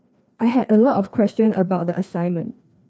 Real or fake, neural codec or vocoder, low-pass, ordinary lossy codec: fake; codec, 16 kHz, 2 kbps, FreqCodec, larger model; none; none